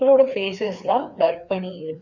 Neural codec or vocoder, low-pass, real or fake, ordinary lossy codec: codec, 16 kHz, 2 kbps, FreqCodec, larger model; 7.2 kHz; fake; none